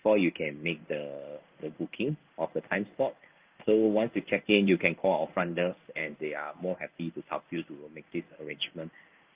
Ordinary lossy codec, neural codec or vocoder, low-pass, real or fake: Opus, 32 kbps; codec, 16 kHz in and 24 kHz out, 1 kbps, XY-Tokenizer; 3.6 kHz; fake